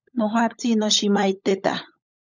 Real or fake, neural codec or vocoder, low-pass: fake; codec, 16 kHz, 16 kbps, FunCodec, trained on LibriTTS, 50 frames a second; 7.2 kHz